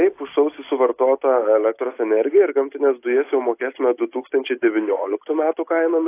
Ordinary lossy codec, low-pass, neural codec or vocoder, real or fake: AAC, 24 kbps; 3.6 kHz; none; real